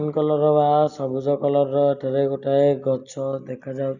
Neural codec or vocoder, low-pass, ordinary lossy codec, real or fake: none; 7.2 kHz; none; real